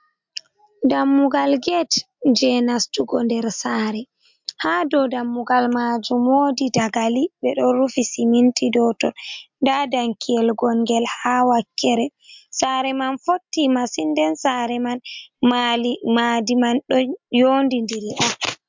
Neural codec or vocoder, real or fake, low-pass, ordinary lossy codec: none; real; 7.2 kHz; MP3, 64 kbps